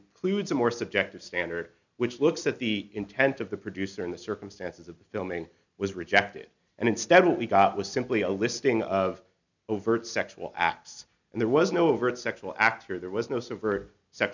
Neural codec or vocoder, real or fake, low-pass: none; real; 7.2 kHz